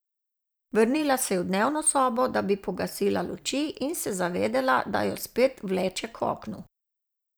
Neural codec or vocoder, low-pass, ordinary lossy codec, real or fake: vocoder, 44.1 kHz, 128 mel bands every 512 samples, BigVGAN v2; none; none; fake